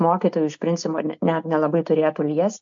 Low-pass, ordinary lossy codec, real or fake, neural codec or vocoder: 7.2 kHz; AAC, 64 kbps; real; none